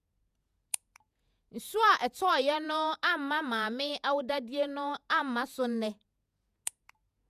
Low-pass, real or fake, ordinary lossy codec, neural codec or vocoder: 14.4 kHz; fake; none; vocoder, 48 kHz, 128 mel bands, Vocos